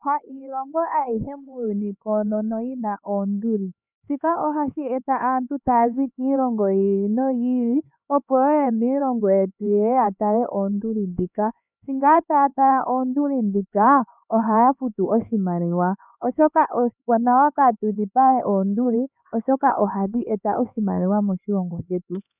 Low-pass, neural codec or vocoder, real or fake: 3.6 kHz; codec, 16 kHz, 4 kbps, X-Codec, HuBERT features, trained on LibriSpeech; fake